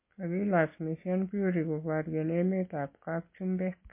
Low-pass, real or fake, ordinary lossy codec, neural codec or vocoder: 3.6 kHz; real; MP3, 24 kbps; none